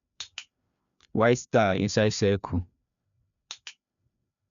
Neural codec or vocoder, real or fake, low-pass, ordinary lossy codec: codec, 16 kHz, 2 kbps, FreqCodec, larger model; fake; 7.2 kHz; MP3, 96 kbps